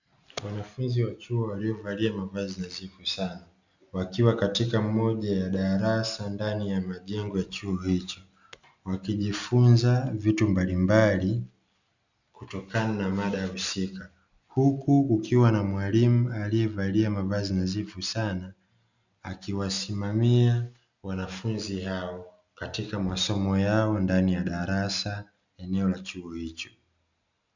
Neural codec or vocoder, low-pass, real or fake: none; 7.2 kHz; real